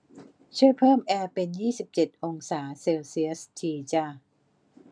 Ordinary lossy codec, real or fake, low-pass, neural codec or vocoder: none; real; 9.9 kHz; none